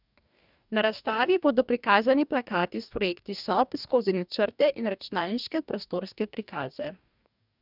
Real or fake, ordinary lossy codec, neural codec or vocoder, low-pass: fake; none; codec, 44.1 kHz, 2.6 kbps, DAC; 5.4 kHz